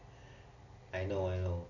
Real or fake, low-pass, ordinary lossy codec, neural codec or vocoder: real; 7.2 kHz; none; none